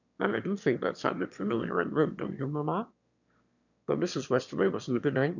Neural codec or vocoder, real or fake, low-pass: autoencoder, 22.05 kHz, a latent of 192 numbers a frame, VITS, trained on one speaker; fake; 7.2 kHz